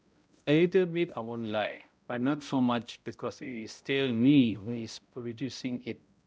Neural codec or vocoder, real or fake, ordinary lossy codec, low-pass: codec, 16 kHz, 0.5 kbps, X-Codec, HuBERT features, trained on balanced general audio; fake; none; none